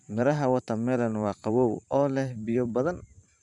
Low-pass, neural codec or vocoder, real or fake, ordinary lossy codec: 10.8 kHz; vocoder, 44.1 kHz, 128 mel bands every 512 samples, BigVGAN v2; fake; none